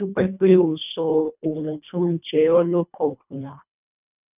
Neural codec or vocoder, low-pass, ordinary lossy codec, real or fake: codec, 24 kHz, 1.5 kbps, HILCodec; 3.6 kHz; none; fake